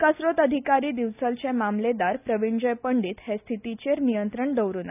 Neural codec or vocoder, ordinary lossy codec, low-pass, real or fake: none; none; 3.6 kHz; real